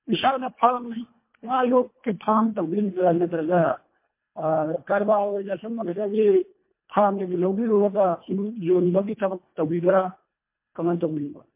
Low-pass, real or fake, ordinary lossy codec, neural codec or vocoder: 3.6 kHz; fake; MP3, 24 kbps; codec, 24 kHz, 1.5 kbps, HILCodec